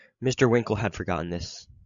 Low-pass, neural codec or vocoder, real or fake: 7.2 kHz; codec, 16 kHz, 16 kbps, FreqCodec, larger model; fake